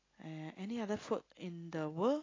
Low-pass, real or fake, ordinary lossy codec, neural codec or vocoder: 7.2 kHz; real; AAC, 32 kbps; none